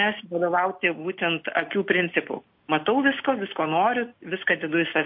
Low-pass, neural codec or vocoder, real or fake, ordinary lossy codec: 5.4 kHz; none; real; MP3, 32 kbps